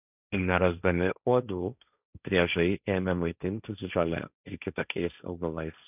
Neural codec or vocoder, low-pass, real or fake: codec, 16 kHz, 1.1 kbps, Voila-Tokenizer; 3.6 kHz; fake